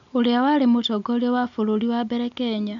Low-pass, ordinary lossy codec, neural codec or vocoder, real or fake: 7.2 kHz; none; none; real